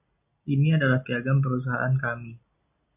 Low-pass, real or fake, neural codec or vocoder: 3.6 kHz; real; none